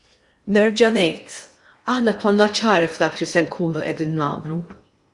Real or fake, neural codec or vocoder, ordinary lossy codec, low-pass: fake; codec, 16 kHz in and 24 kHz out, 0.8 kbps, FocalCodec, streaming, 65536 codes; Opus, 64 kbps; 10.8 kHz